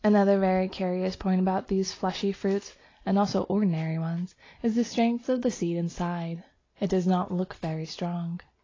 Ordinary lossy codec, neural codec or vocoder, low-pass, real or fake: AAC, 32 kbps; none; 7.2 kHz; real